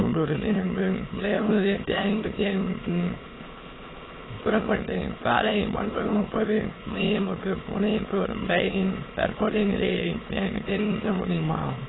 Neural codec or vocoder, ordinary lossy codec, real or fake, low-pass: autoencoder, 22.05 kHz, a latent of 192 numbers a frame, VITS, trained on many speakers; AAC, 16 kbps; fake; 7.2 kHz